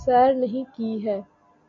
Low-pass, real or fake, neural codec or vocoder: 7.2 kHz; real; none